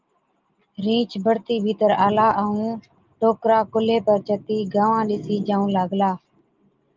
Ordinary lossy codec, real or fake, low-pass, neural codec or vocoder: Opus, 32 kbps; real; 7.2 kHz; none